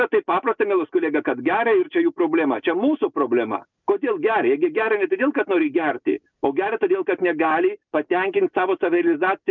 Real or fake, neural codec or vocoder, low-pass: real; none; 7.2 kHz